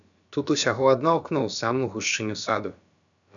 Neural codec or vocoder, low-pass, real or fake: codec, 16 kHz, about 1 kbps, DyCAST, with the encoder's durations; 7.2 kHz; fake